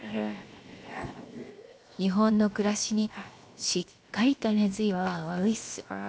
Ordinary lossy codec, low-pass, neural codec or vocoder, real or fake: none; none; codec, 16 kHz, 0.7 kbps, FocalCodec; fake